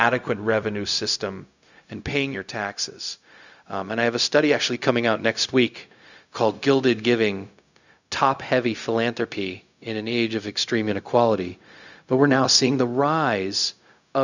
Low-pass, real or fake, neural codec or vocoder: 7.2 kHz; fake; codec, 16 kHz, 0.4 kbps, LongCat-Audio-Codec